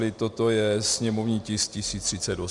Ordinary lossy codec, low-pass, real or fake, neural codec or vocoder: Opus, 64 kbps; 10.8 kHz; real; none